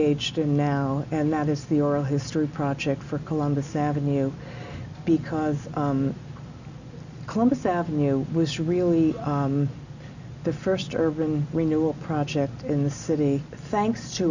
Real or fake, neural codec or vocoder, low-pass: real; none; 7.2 kHz